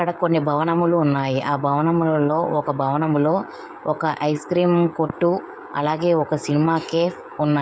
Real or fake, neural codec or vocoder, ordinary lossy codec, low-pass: fake; codec, 16 kHz, 16 kbps, FreqCodec, smaller model; none; none